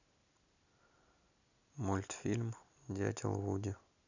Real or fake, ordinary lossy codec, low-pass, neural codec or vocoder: real; none; 7.2 kHz; none